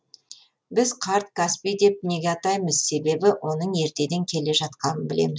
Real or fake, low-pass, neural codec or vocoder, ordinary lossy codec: real; none; none; none